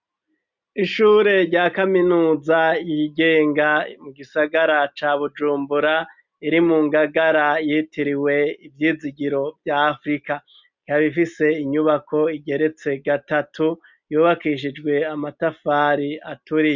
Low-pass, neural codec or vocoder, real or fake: 7.2 kHz; none; real